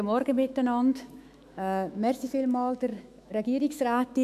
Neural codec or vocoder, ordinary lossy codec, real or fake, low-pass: autoencoder, 48 kHz, 128 numbers a frame, DAC-VAE, trained on Japanese speech; none; fake; 14.4 kHz